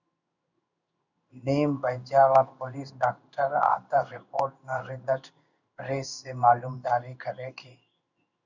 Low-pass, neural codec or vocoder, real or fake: 7.2 kHz; codec, 16 kHz in and 24 kHz out, 1 kbps, XY-Tokenizer; fake